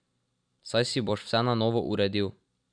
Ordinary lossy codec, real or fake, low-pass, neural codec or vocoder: none; fake; 9.9 kHz; vocoder, 44.1 kHz, 128 mel bands every 256 samples, BigVGAN v2